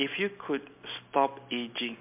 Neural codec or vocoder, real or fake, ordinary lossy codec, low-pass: none; real; MP3, 32 kbps; 3.6 kHz